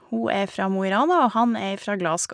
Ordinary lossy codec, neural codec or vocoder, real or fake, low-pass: none; none; real; 9.9 kHz